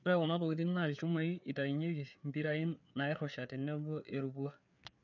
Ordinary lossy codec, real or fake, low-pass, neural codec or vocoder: none; fake; 7.2 kHz; codec, 16 kHz, 4 kbps, FreqCodec, larger model